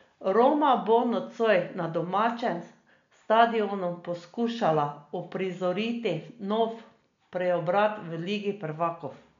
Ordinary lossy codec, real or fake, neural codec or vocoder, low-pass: MP3, 64 kbps; real; none; 7.2 kHz